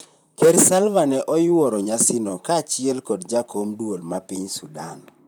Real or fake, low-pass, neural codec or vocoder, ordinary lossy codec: fake; none; vocoder, 44.1 kHz, 128 mel bands, Pupu-Vocoder; none